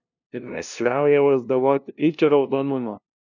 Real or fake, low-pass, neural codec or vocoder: fake; 7.2 kHz; codec, 16 kHz, 0.5 kbps, FunCodec, trained on LibriTTS, 25 frames a second